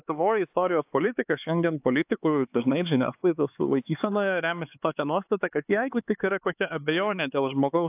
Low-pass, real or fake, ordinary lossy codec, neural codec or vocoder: 3.6 kHz; fake; AAC, 32 kbps; codec, 16 kHz, 4 kbps, X-Codec, HuBERT features, trained on LibriSpeech